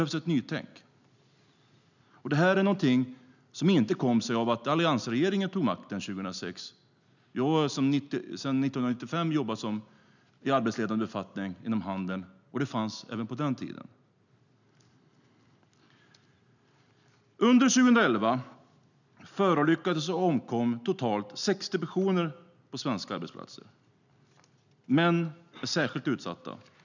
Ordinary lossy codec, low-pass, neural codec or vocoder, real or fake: none; 7.2 kHz; none; real